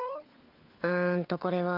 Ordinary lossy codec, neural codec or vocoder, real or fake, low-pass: Opus, 32 kbps; codec, 16 kHz, 4 kbps, FunCodec, trained on LibriTTS, 50 frames a second; fake; 5.4 kHz